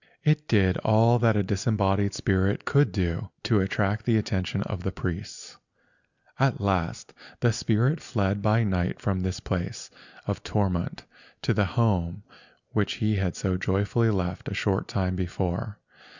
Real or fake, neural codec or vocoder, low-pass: real; none; 7.2 kHz